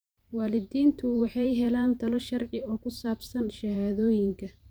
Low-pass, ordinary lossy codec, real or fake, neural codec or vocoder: none; none; fake; vocoder, 44.1 kHz, 128 mel bands every 512 samples, BigVGAN v2